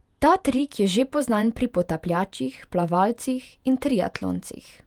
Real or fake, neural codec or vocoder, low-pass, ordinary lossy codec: fake; vocoder, 48 kHz, 128 mel bands, Vocos; 19.8 kHz; Opus, 32 kbps